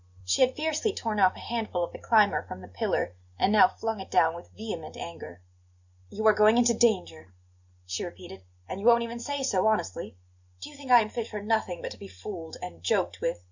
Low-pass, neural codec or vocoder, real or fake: 7.2 kHz; none; real